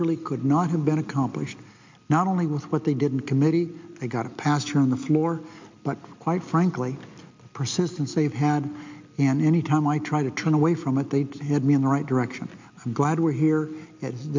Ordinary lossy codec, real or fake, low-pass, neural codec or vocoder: MP3, 64 kbps; real; 7.2 kHz; none